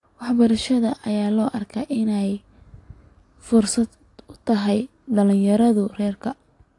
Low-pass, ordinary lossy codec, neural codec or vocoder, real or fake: 10.8 kHz; AAC, 48 kbps; none; real